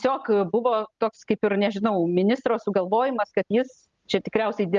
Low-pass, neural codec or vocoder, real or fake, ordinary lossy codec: 7.2 kHz; none; real; Opus, 32 kbps